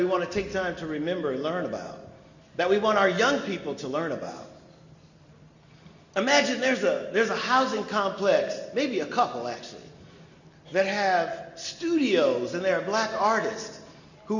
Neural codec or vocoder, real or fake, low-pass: none; real; 7.2 kHz